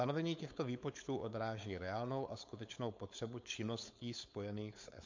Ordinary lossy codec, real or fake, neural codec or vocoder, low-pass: MP3, 48 kbps; fake; codec, 16 kHz, 4.8 kbps, FACodec; 7.2 kHz